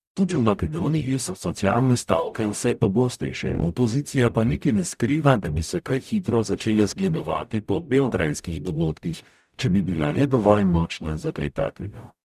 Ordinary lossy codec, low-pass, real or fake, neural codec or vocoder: none; 14.4 kHz; fake; codec, 44.1 kHz, 0.9 kbps, DAC